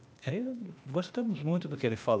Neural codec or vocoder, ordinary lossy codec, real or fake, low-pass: codec, 16 kHz, 0.8 kbps, ZipCodec; none; fake; none